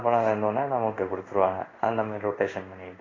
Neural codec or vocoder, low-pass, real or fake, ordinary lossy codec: codec, 16 kHz in and 24 kHz out, 1 kbps, XY-Tokenizer; 7.2 kHz; fake; AAC, 32 kbps